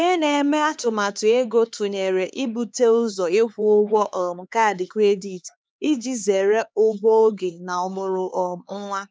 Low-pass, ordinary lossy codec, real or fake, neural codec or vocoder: none; none; fake; codec, 16 kHz, 4 kbps, X-Codec, HuBERT features, trained on LibriSpeech